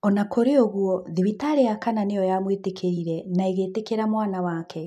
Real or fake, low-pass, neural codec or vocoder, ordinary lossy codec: real; 14.4 kHz; none; none